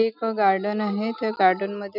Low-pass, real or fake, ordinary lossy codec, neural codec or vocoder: 5.4 kHz; real; none; none